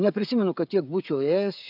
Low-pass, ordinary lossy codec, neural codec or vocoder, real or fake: 5.4 kHz; AAC, 48 kbps; codec, 16 kHz, 16 kbps, FreqCodec, smaller model; fake